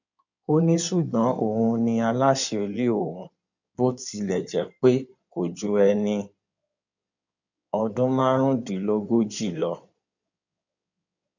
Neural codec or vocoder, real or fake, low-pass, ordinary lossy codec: codec, 16 kHz in and 24 kHz out, 2.2 kbps, FireRedTTS-2 codec; fake; 7.2 kHz; none